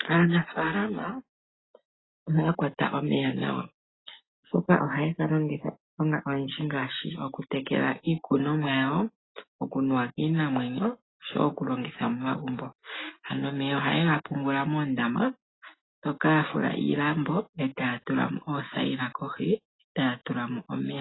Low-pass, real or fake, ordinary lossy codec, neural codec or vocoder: 7.2 kHz; real; AAC, 16 kbps; none